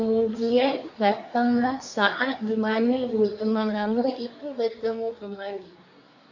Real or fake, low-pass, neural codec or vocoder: fake; 7.2 kHz; codec, 24 kHz, 1 kbps, SNAC